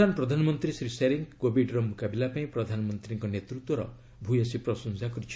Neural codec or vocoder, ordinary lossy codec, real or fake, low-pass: none; none; real; none